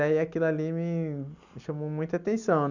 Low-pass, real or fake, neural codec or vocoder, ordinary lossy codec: 7.2 kHz; real; none; none